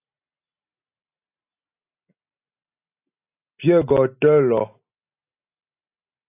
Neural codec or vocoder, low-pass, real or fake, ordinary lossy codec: none; 3.6 kHz; real; AAC, 24 kbps